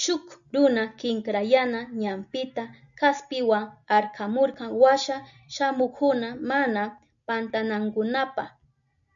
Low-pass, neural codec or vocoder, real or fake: 7.2 kHz; none; real